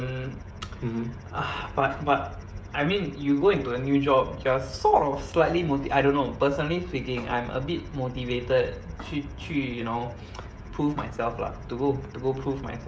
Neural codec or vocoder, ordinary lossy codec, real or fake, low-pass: codec, 16 kHz, 16 kbps, FreqCodec, smaller model; none; fake; none